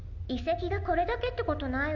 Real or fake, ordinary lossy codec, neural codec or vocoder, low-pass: real; none; none; 7.2 kHz